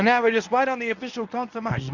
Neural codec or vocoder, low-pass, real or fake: codec, 24 kHz, 0.9 kbps, WavTokenizer, medium speech release version 1; 7.2 kHz; fake